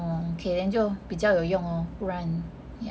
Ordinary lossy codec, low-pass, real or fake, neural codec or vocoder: none; none; real; none